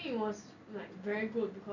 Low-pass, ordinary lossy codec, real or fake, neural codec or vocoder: 7.2 kHz; none; real; none